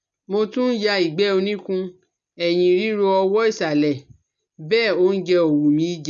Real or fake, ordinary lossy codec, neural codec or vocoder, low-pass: real; none; none; 7.2 kHz